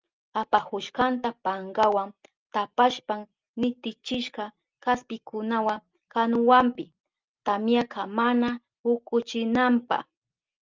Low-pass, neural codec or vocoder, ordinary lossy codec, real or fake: 7.2 kHz; none; Opus, 24 kbps; real